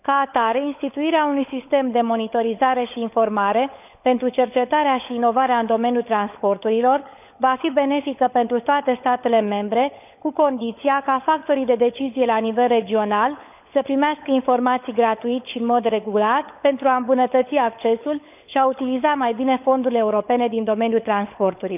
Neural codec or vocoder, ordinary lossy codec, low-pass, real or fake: codec, 16 kHz, 16 kbps, FunCodec, trained on LibriTTS, 50 frames a second; none; 3.6 kHz; fake